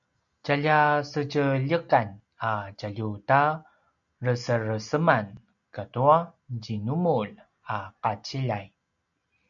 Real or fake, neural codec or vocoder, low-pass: real; none; 7.2 kHz